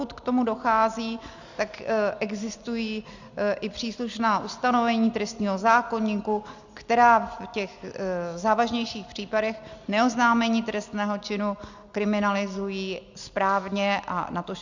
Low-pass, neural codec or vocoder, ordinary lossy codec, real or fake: 7.2 kHz; none; Opus, 64 kbps; real